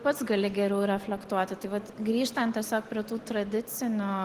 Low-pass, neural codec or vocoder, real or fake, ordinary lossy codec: 14.4 kHz; none; real; Opus, 16 kbps